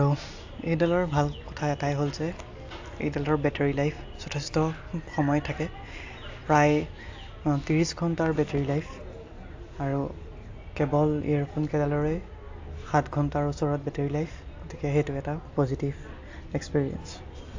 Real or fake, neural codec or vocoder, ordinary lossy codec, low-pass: real; none; AAC, 48 kbps; 7.2 kHz